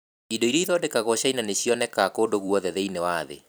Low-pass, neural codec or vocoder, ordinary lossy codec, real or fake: none; vocoder, 44.1 kHz, 128 mel bands every 256 samples, BigVGAN v2; none; fake